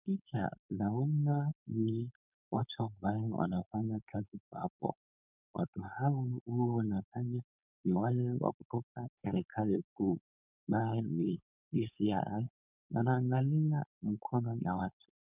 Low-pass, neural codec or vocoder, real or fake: 3.6 kHz; codec, 16 kHz, 4.8 kbps, FACodec; fake